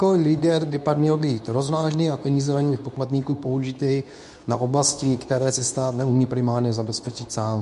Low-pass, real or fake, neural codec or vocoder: 10.8 kHz; fake; codec, 24 kHz, 0.9 kbps, WavTokenizer, medium speech release version 2